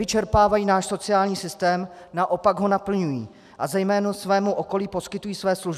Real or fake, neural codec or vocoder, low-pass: real; none; 14.4 kHz